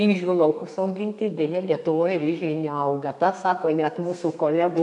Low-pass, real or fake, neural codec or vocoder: 10.8 kHz; fake; codec, 32 kHz, 1.9 kbps, SNAC